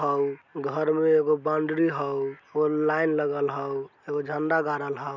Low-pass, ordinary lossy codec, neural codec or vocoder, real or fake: 7.2 kHz; none; none; real